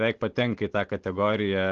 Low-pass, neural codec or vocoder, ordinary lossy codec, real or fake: 7.2 kHz; none; Opus, 16 kbps; real